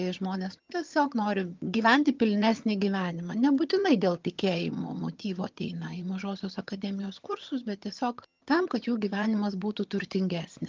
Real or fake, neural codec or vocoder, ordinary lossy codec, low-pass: fake; vocoder, 22.05 kHz, 80 mel bands, HiFi-GAN; Opus, 24 kbps; 7.2 kHz